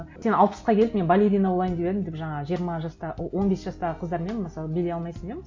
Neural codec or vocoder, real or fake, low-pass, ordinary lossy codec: none; real; 7.2 kHz; MP3, 48 kbps